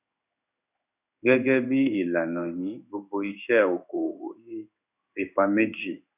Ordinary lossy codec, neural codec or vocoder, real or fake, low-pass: none; codec, 16 kHz in and 24 kHz out, 1 kbps, XY-Tokenizer; fake; 3.6 kHz